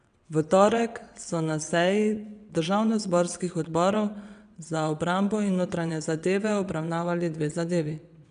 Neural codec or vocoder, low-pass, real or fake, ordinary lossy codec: vocoder, 22.05 kHz, 80 mel bands, WaveNeXt; 9.9 kHz; fake; none